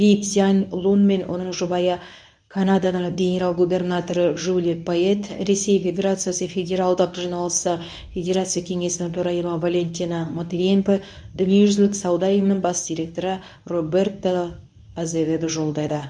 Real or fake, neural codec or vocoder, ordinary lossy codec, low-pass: fake; codec, 24 kHz, 0.9 kbps, WavTokenizer, medium speech release version 1; none; 9.9 kHz